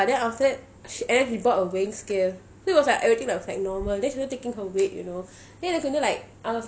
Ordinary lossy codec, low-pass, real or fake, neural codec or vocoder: none; none; real; none